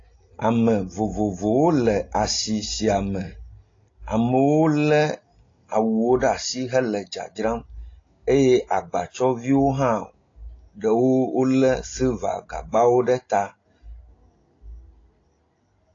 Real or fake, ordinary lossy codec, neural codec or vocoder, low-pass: real; AAC, 32 kbps; none; 7.2 kHz